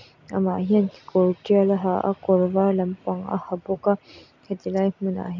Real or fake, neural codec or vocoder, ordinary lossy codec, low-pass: real; none; none; 7.2 kHz